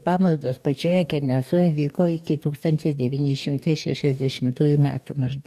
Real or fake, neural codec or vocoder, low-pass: fake; codec, 44.1 kHz, 2.6 kbps, DAC; 14.4 kHz